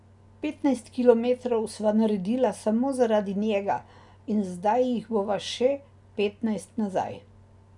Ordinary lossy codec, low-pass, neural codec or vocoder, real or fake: none; 10.8 kHz; none; real